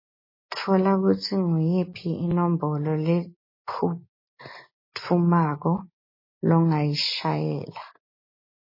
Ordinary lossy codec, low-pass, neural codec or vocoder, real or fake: MP3, 24 kbps; 5.4 kHz; none; real